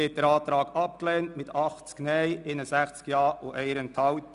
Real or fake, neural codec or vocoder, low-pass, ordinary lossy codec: real; none; 10.8 kHz; none